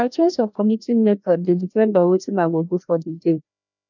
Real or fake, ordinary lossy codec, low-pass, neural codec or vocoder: fake; none; 7.2 kHz; codec, 16 kHz, 1 kbps, FreqCodec, larger model